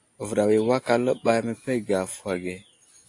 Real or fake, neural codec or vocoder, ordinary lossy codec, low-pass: real; none; AAC, 48 kbps; 10.8 kHz